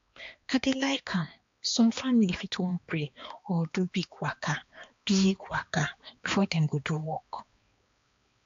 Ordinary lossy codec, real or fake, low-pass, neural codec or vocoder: AAC, 48 kbps; fake; 7.2 kHz; codec, 16 kHz, 2 kbps, X-Codec, HuBERT features, trained on balanced general audio